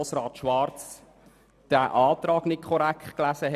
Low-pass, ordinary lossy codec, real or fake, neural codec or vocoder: 14.4 kHz; none; real; none